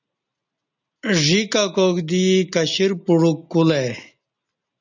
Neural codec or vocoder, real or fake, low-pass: none; real; 7.2 kHz